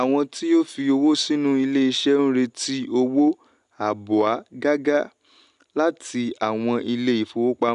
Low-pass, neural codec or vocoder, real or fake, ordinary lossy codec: 10.8 kHz; none; real; none